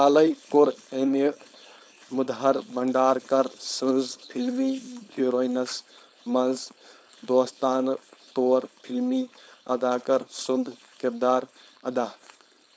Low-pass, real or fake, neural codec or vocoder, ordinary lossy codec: none; fake; codec, 16 kHz, 4.8 kbps, FACodec; none